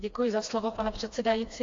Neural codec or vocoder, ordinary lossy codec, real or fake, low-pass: codec, 16 kHz, 2 kbps, FreqCodec, smaller model; AAC, 48 kbps; fake; 7.2 kHz